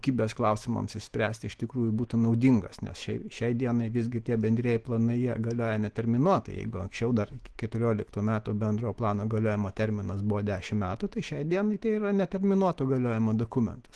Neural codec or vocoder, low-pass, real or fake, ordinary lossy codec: autoencoder, 48 kHz, 128 numbers a frame, DAC-VAE, trained on Japanese speech; 10.8 kHz; fake; Opus, 16 kbps